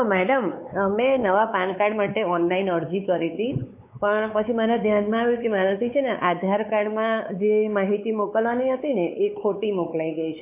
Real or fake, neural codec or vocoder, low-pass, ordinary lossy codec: fake; codec, 16 kHz, 4 kbps, X-Codec, WavLM features, trained on Multilingual LibriSpeech; 3.6 kHz; AAC, 32 kbps